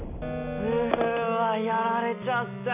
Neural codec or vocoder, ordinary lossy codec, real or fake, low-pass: none; none; real; 3.6 kHz